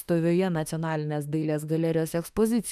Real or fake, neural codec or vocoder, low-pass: fake; autoencoder, 48 kHz, 32 numbers a frame, DAC-VAE, trained on Japanese speech; 14.4 kHz